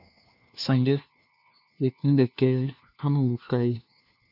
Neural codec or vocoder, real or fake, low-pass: codec, 16 kHz, 1 kbps, FunCodec, trained on LibriTTS, 50 frames a second; fake; 5.4 kHz